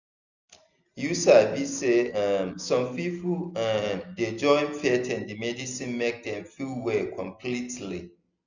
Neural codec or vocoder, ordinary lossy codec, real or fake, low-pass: none; none; real; 7.2 kHz